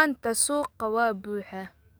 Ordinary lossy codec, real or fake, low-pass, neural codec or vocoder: none; fake; none; codec, 44.1 kHz, 7.8 kbps, DAC